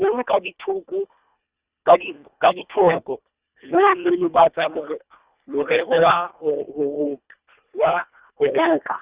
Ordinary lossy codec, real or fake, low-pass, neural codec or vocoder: Opus, 64 kbps; fake; 3.6 kHz; codec, 24 kHz, 1.5 kbps, HILCodec